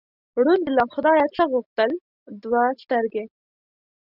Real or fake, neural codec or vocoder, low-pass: real; none; 5.4 kHz